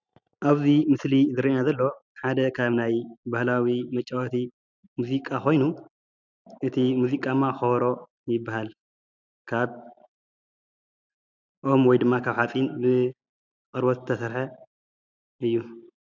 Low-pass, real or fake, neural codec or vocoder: 7.2 kHz; real; none